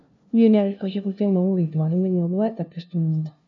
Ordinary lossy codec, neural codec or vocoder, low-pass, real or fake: MP3, 64 kbps; codec, 16 kHz, 0.5 kbps, FunCodec, trained on LibriTTS, 25 frames a second; 7.2 kHz; fake